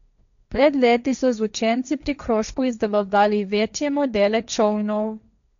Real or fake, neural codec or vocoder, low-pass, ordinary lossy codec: fake; codec, 16 kHz, 1.1 kbps, Voila-Tokenizer; 7.2 kHz; none